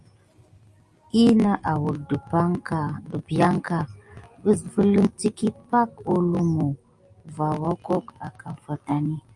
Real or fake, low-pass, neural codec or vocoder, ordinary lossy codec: real; 10.8 kHz; none; Opus, 32 kbps